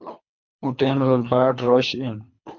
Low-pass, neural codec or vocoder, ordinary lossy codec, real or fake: 7.2 kHz; codec, 24 kHz, 3 kbps, HILCodec; MP3, 48 kbps; fake